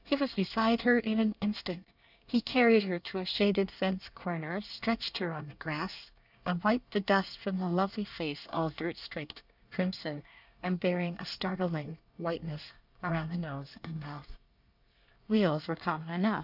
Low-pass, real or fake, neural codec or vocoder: 5.4 kHz; fake; codec, 24 kHz, 1 kbps, SNAC